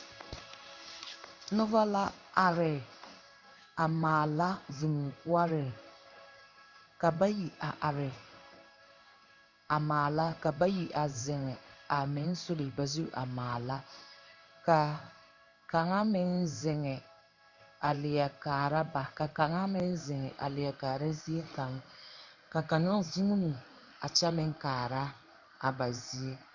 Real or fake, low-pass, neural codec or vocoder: fake; 7.2 kHz; codec, 16 kHz in and 24 kHz out, 1 kbps, XY-Tokenizer